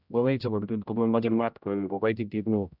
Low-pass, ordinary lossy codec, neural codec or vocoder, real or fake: 5.4 kHz; none; codec, 16 kHz, 0.5 kbps, X-Codec, HuBERT features, trained on general audio; fake